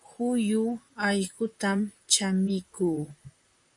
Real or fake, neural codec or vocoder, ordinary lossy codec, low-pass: fake; vocoder, 44.1 kHz, 128 mel bands, Pupu-Vocoder; MP3, 96 kbps; 10.8 kHz